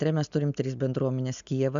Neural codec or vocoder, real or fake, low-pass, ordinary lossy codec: none; real; 7.2 kHz; MP3, 96 kbps